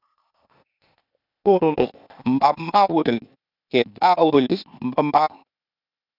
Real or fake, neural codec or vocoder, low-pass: fake; codec, 16 kHz, 0.8 kbps, ZipCodec; 5.4 kHz